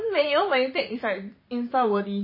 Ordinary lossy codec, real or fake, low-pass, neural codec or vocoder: MP3, 24 kbps; fake; 5.4 kHz; vocoder, 44.1 kHz, 128 mel bands, Pupu-Vocoder